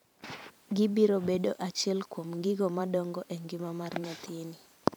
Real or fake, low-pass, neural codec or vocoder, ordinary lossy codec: fake; none; vocoder, 44.1 kHz, 128 mel bands every 512 samples, BigVGAN v2; none